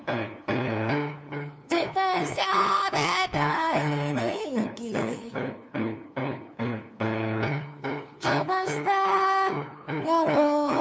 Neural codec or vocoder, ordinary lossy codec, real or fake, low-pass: codec, 16 kHz, 2 kbps, FunCodec, trained on LibriTTS, 25 frames a second; none; fake; none